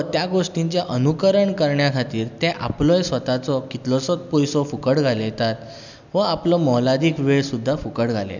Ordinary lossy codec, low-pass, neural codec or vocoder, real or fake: none; 7.2 kHz; none; real